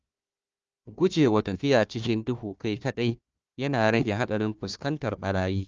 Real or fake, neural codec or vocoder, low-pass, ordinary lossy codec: fake; codec, 16 kHz, 1 kbps, FunCodec, trained on Chinese and English, 50 frames a second; 7.2 kHz; Opus, 24 kbps